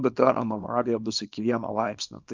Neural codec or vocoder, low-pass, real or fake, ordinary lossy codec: codec, 24 kHz, 0.9 kbps, WavTokenizer, small release; 7.2 kHz; fake; Opus, 24 kbps